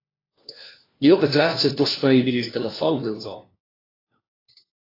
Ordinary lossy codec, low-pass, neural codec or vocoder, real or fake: AAC, 24 kbps; 5.4 kHz; codec, 16 kHz, 1 kbps, FunCodec, trained on LibriTTS, 50 frames a second; fake